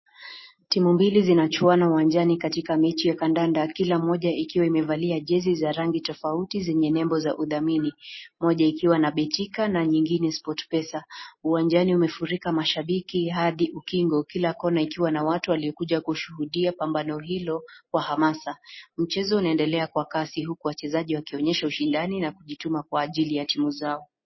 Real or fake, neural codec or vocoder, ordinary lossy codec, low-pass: real; none; MP3, 24 kbps; 7.2 kHz